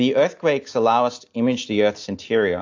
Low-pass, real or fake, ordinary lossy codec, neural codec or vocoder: 7.2 kHz; real; AAC, 48 kbps; none